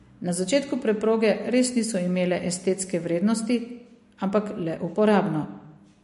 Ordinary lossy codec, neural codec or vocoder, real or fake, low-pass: MP3, 48 kbps; none; real; 14.4 kHz